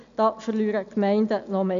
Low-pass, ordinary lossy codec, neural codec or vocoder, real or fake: 7.2 kHz; MP3, 64 kbps; none; real